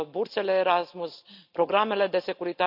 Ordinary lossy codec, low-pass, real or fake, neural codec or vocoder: none; 5.4 kHz; real; none